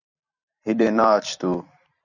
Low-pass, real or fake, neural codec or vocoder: 7.2 kHz; fake; vocoder, 44.1 kHz, 128 mel bands every 256 samples, BigVGAN v2